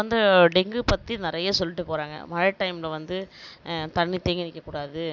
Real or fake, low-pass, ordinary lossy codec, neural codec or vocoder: real; 7.2 kHz; none; none